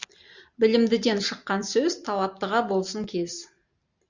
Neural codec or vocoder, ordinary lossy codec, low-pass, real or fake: none; Opus, 64 kbps; 7.2 kHz; real